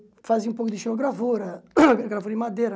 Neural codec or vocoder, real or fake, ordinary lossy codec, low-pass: none; real; none; none